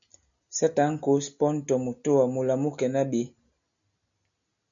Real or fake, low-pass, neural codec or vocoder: real; 7.2 kHz; none